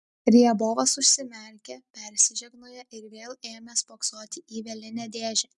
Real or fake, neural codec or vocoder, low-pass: real; none; 10.8 kHz